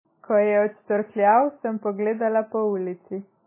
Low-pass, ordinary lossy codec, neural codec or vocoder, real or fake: 3.6 kHz; MP3, 16 kbps; none; real